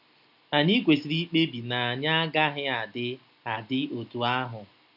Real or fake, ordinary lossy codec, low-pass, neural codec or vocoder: real; none; 5.4 kHz; none